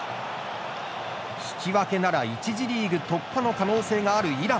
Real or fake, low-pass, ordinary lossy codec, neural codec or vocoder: real; none; none; none